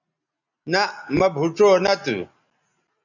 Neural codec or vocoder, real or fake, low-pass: none; real; 7.2 kHz